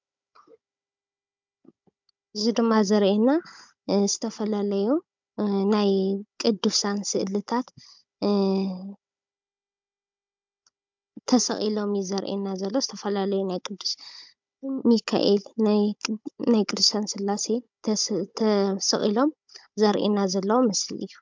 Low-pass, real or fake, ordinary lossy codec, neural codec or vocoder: 7.2 kHz; fake; MP3, 64 kbps; codec, 16 kHz, 16 kbps, FunCodec, trained on Chinese and English, 50 frames a second